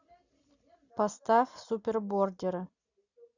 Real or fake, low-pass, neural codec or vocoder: real; 7.2 kHz; none